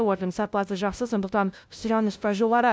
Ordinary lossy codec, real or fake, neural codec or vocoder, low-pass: none; fake; codec, 16 kHz, 0.5 kbps, FunCodec, trained on LibriTTS, 25 frames a second; none